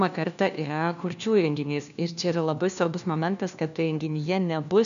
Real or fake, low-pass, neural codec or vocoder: fake; 7.2 kHz; codec, 16 kHz, 1 kbps, FunCodec, trained on LibriTTS, 50 frames a second